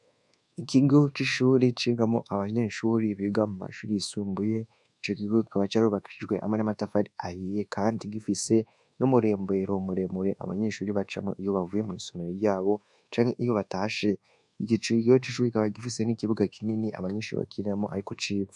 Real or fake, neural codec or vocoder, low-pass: fake; codec, 24 kHz, 1.2 kbps, DualCodec; 10.8 kHz